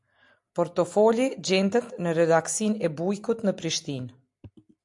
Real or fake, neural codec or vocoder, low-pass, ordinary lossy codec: real; none; 10.8 kHz; AAC, 64 kbps